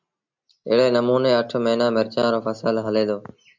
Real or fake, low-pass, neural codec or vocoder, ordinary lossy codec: real; 7.2 kHz; none; MP3, 64 kbps